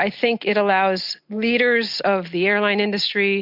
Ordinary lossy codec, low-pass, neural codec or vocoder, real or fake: MP3, 48 kbps; 5.4 kHz; none; real